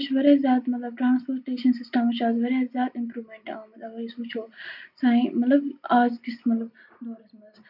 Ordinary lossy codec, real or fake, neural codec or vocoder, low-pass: none; real; none; 5.4 kHz